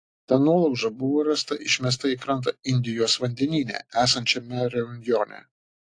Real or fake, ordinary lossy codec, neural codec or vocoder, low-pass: real; AAC, 48 kbps; none; 9.9 kHz